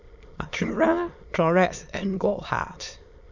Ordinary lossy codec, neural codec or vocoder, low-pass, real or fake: Opus, 64 kbps; autoencoder, 22.05 kHz, a latent of 192 numbers a frame, VITS, trained on many speakers; 7.2 kHz; fake